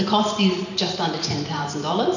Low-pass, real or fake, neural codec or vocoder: 7.2 kHz; fake; vocoder, 44.1 kHz, 128 mel bands every 512 samples, BigVGAN v2